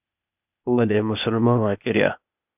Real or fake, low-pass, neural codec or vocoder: fake; 3.6 kHz; codec, 16 kHz, 0.8 kbps, ZipCodec